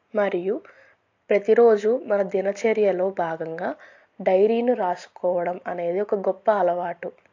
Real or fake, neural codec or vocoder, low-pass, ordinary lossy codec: real; none; 7.2 kHz; AAC, 48 kbps